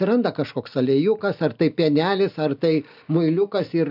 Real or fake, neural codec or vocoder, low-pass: real; none; 5.4 kHz